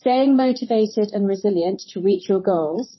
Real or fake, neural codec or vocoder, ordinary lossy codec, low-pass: fake; vocoder, 44.1 kHz, 128 mel bands, Pupu-Vocoder; MP3, 24 kbps; 7.2 kHz